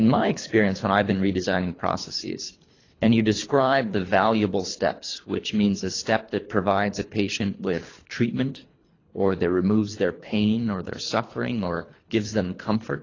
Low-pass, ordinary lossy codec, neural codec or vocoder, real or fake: 7.2 kHz; AAC, 32 kbps; codec, 24 kHz, 3 kbps, HILCodec; fake